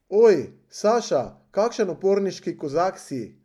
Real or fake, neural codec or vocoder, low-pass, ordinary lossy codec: real; none; 19.8 kHz; MP3, 96 kbps